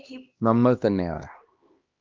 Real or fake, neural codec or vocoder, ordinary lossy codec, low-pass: fake; codec, 16 kHz, 1 kbps, X-Codec, HuBERT features, trained on LibriSpeech; Opus, 24 kbps; 7.2 kHz